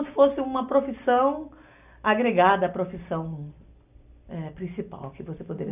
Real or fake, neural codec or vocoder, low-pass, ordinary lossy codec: real; none; 3.6 kHz; none